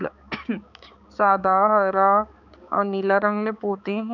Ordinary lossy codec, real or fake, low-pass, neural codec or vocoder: none; fake; 7.2 kHz; codec, 16 kHz, 4 kbps, X-Codec, HuBERT features, trained on balanced general audio